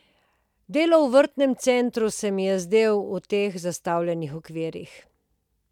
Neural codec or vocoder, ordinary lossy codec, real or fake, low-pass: none; none; real; 19.8 kHz